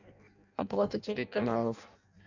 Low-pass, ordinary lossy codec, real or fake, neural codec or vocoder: 7.2 kHz; none; fake; codec, 16 kHz in and 24 kHz out, 0.6 kbps, FireRedTTS-2 codec